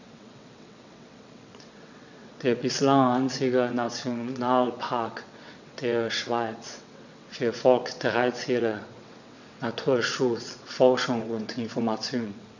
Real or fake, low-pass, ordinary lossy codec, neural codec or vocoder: fake; 7.2 kHz; none; vocoder, 22.05 kHz, 80 mel bands, WaveNeXt